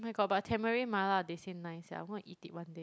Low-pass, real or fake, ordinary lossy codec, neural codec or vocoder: none; real; none; none